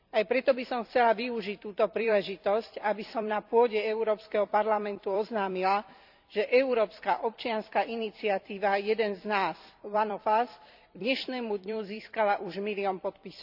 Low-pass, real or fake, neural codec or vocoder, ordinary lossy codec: 5.4 kHz; fake; vocoder, 44.1 kHz, 128 mel bands every 256 samples, BigVGAN v2; none